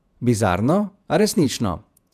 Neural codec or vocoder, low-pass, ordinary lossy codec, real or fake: vocoder, 48 kHz, 128 mel bands, Vocos; 14.4 kHz; none; fake